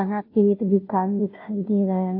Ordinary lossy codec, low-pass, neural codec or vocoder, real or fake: AAC, 32 kbps; 5.4 kHz; codec, 16 kHz, 0.5 kbps, FunCodec, trained on Chinese and English, 25 frames a second; fake